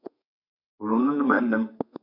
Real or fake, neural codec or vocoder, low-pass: fake; codec, 32 kHz, 1.9 kbps, SNAC; 5.4 kHz